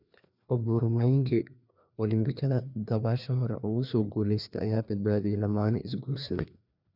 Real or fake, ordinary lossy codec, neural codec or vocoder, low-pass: fake; none; codec, 16 kHz, 2 kbps, FreqCodec, larger model; 5.4 kHz